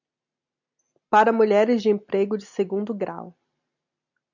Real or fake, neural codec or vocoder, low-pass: real; none; 7.2 kHz